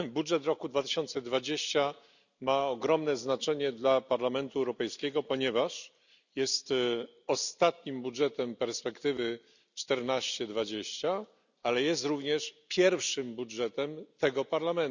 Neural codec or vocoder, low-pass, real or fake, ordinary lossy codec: none; 7.2 kHz; real; none